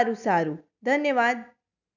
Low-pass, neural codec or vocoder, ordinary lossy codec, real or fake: 7.2 kHz; none; none; real